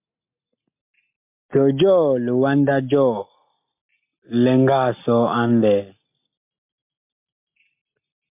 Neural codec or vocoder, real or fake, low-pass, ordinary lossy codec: none; real; 3.6 kHz; MP3, 32 kbps